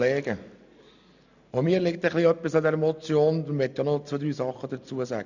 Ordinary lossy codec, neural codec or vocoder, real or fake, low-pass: none; none; real; 7.2 kHz